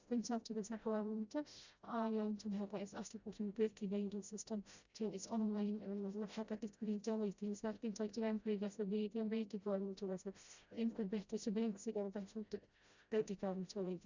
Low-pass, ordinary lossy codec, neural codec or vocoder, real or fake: 7.2 kHz; Opus, 64 kbps; codec, 16 kHz, 0.5 kbps, FreqCodec, smaller model; fake